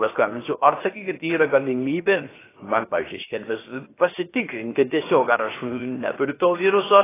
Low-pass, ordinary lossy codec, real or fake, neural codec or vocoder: 3.6 kHz; AAC, 16 kbps; fake; codec, 16 kHz, 0.7 kbps, FocalCodec